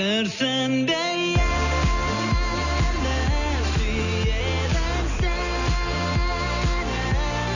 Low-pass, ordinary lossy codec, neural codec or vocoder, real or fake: 7.2 kHz; none; none; real